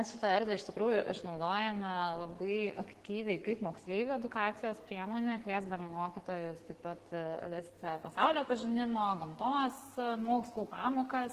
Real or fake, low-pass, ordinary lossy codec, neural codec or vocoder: fake; 14.4 kHz; Opus, 16 kbps; codec, 44.1 kHz, 2.6 kbps, SNAC